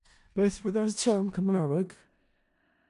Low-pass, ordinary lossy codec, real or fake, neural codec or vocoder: 10.8 kHz; AAC, 96 kbps; fake; codec, 16 kHz in and 24 kHz out, 0.4 kbps, LongCat-Audio-Codec, four codebook decoder